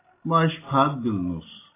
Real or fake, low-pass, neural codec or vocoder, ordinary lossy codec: real; 3.6 kHz; none; AAC, 16 kbps